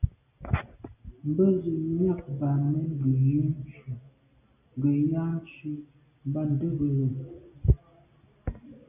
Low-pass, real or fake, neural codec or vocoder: 3.6 kHz; real; none